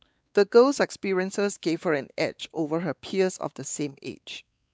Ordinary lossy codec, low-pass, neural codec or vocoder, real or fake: none; none; codec, 16 kHz, 4 kbps, X-Codec, WavLM features, trained on Multilingual LibriSpeech; fake